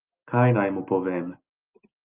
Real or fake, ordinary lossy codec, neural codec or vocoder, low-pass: real; Opus, 32 kbps; none; 3.6 kHz